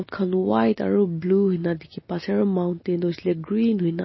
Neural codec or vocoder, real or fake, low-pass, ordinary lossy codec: none; real; 7.2 kHz; MP3, 24 kbps